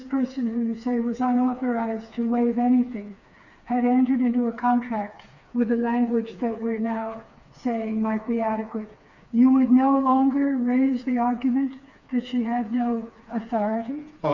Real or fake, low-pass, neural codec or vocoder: fake; 7.2 kHz; codec, 16 kHz, 4 kbps, FreqCodec, smaller model